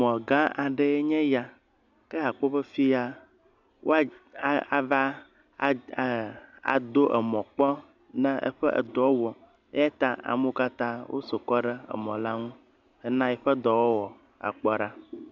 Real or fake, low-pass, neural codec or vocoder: real; 7.2 kHz; none